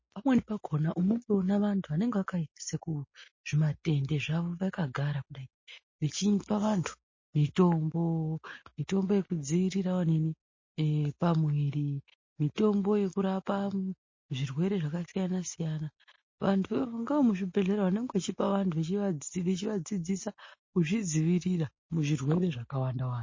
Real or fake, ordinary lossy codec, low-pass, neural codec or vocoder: real; MP3, 32 kbps; 7.2 kHz; none